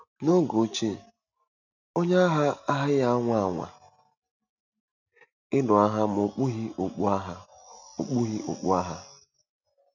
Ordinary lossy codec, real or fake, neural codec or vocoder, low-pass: none; real; none; 7.2 kHz